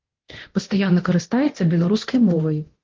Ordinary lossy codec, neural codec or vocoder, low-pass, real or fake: Opus, 16 kbps; codec, 24 kHz, 0.9 kbps, DualCodec; 7.2 kHz; fake